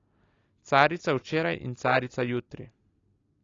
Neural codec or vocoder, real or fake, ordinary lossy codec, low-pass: none; real; AAC, 32 kbps; 7.2 kHz